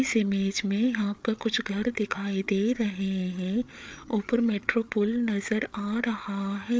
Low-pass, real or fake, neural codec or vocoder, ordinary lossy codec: none; fake; codec, 16 kHz, 8 kbps, FreqCodec, larger model; none